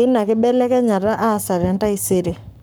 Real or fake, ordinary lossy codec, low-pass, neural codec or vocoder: fake; none; none; codec, 44.1 kHz, 7.8 kbps, Pupu-Codec